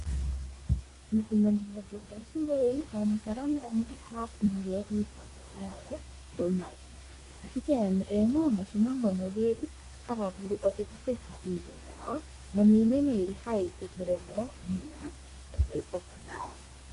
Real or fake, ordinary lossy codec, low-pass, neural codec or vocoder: fake; AAC, 48 kbps; 10.8 kHz; codec, 24 kHz, 1 kbps, SNAC